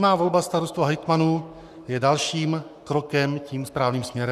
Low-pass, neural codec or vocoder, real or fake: 14.4 kHz; codec, 44.1 kHz, 7.8 kbps, Pupu-Codec; fake